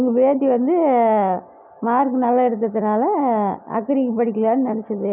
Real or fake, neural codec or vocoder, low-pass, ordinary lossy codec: real; none; 3.6 kHz; none